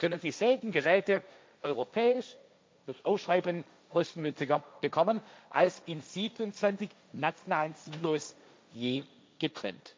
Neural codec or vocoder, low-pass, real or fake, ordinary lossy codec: codec, 16 kHz, 1.1 kbps, Voila-Tokenizer; none; fake; none